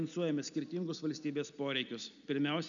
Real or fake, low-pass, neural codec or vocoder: real; 7.2 kHz; none